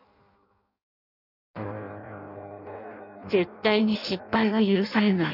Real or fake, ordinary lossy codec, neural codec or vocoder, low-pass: fake; none; codec, 16 kHz in and 24 kHz out, 0.6 kbps, FireRedTTS-2 codec; 5.4 kHz